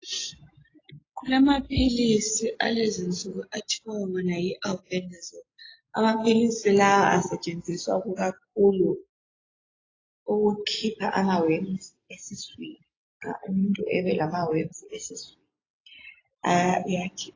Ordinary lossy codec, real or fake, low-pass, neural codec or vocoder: AAC, 32 kbps; real; 7.2 kHz; none